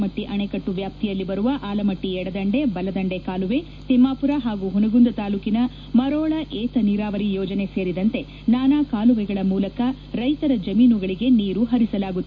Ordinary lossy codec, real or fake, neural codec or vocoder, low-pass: none; real; none; 7.2 kHz